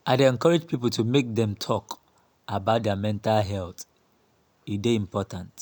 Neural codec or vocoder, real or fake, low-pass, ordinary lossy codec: none; real; none; none